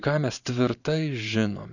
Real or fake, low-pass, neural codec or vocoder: fake; 7.2 kHz; vocoder, 44.1 kHz, 128 mel bands every 512 samples, BigVGAN v2